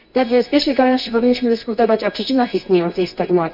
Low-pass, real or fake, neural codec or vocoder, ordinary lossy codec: 5.4 kHz; fake; codec, 24 kHz, 0.9 kbps, WavTokenizer, medium music audio release; none